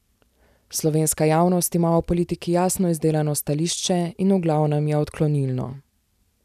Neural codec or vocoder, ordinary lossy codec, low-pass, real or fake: none; none; 14.4 kHz; real